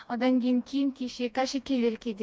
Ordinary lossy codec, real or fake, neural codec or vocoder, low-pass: none; fake; codec, 16 kHz, 2 kbps, FreqCodec, smaller model; none